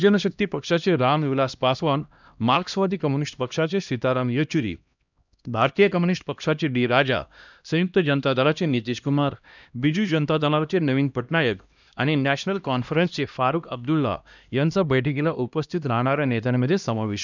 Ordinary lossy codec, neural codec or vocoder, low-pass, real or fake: none; codec, 16 kHz, 1 kbps, X-Codec, HuBERT features, trained on LibriSpeech; 7.2 kHz; fake